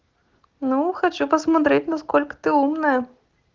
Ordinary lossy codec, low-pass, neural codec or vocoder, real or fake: Opus, 16 kbps; 7.2 kHz; none; real